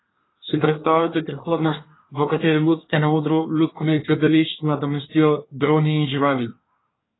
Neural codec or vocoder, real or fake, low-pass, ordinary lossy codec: codec, 24 kHz, 1 kbps, SNAC; fake; 7.2 kHz; AAC, 16 kbps